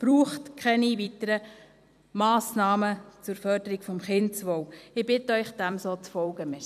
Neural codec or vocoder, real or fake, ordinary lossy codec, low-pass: vocoder, 44.1 kHz, 128 mel bands every 256 samples, BigVGAN v2; fake; none; 14.4 kHz